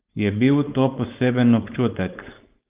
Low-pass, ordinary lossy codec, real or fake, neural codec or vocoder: 3.6 kHz; Opus, 24 kbps; fake; codec, 16 kHz, 4.8 kbps, FACodec